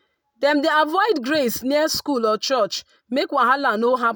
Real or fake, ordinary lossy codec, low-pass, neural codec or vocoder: real; none; none; none